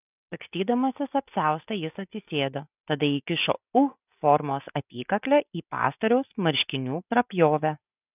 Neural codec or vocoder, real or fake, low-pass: none; real; 3.6 kHz